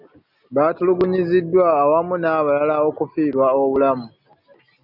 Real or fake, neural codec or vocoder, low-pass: real; none; 5.4 kHz